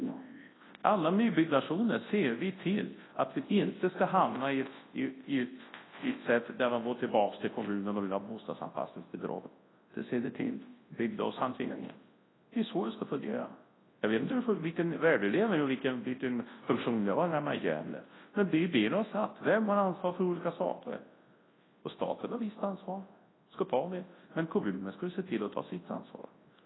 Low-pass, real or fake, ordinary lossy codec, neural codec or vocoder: 7.2 kHz; fake; AAC, 16 kbps; codec, 24 kHz, 0.9 kbps, WavTokenizer, large speech release